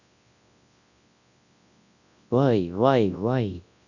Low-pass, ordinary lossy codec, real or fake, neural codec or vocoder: 7.2 kHz; none; fake; codec, 24 kHz, 0.9 kbps, WavTokenizer, large speech release